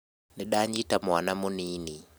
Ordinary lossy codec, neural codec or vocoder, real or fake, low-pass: none; none; real; none